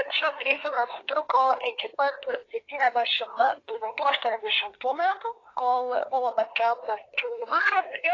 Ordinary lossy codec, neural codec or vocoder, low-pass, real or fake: AAC, 32 kbps; codec, 24 kHz, 1 kbps, SNAC; 7.2 kHz; fake